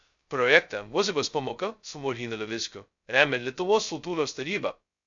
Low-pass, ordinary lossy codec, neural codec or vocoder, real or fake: 7.2 kHz; MP3, 64 kbps; codec, 16 kHz, 0.2 kbps, FocalCodec; fake